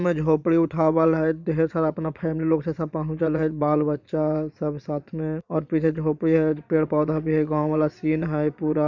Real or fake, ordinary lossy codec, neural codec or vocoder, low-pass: fake; none; vocoder, 22.05 kHz, 80 mel bands, Vocos; 7.2 kHz